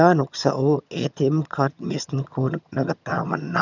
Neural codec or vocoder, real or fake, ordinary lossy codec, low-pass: vocoder, 22.05 kHz, 80 mel bands, HiFi-GAN; fake; none; 7.2 kHz